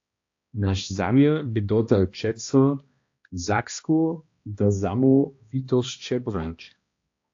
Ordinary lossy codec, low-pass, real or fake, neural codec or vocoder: AAC, 48 kbps; 7.2 kHz; fake; codec, 16 kHz, 1 kbps, X-Codec, HuBERT features, trained on balanced general audio